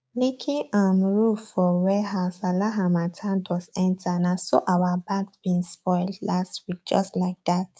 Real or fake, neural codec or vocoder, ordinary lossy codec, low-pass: fake; codec, 16 kHz, 6 kbps, DAC; none; none